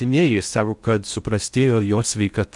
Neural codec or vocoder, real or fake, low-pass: codec, 16 kHz in and 24 kHz out, 0.6 kbps, FocalCodec, streaming, 4096 codes; fake; 10.8 kHz